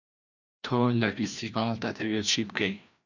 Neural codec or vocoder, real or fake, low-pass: codec, 16 kHz, 1 kbps, FreqCodec, larger model; fake; 7.2 kHz